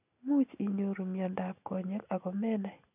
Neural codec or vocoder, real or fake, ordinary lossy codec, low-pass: none; real; none; 3.6 kHz